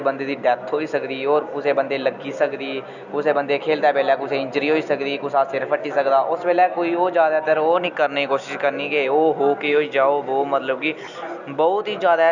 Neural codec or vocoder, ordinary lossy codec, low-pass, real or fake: none; none; 7.2 kHz; real